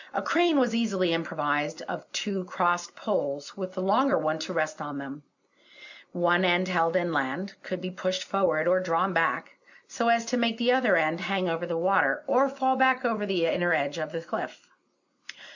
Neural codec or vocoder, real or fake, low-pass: none; real; 7.2 kHz